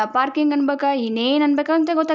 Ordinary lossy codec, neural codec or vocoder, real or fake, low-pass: none; none; real; none